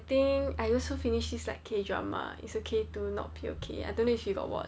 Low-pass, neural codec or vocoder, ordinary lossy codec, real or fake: none; none; none; real